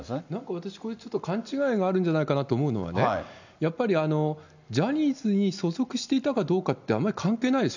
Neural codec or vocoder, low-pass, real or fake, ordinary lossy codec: none; 7.2 kHz; real; none